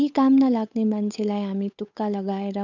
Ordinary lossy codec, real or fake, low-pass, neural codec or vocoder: none; fake; 7.2 kHz; codec, 16 kHz, 8 kbps, FunCodec, trained on Chinese and English, 25 frames a second